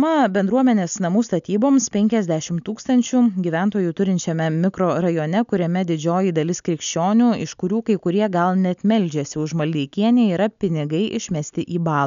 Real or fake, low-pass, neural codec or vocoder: real; 7.2 kHz; none